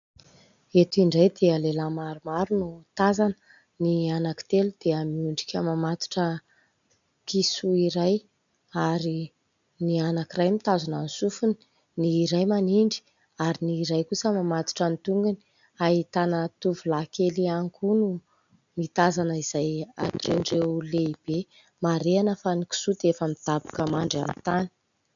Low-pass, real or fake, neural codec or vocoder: 7.2 kHz; real; none